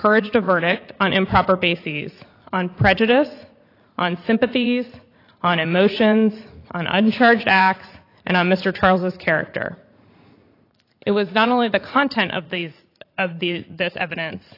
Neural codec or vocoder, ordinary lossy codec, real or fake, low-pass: vocoder, 44.1 kHz, 80 mel bands, Vocos; AAC, 32 kbps; fake; 5.4 kHz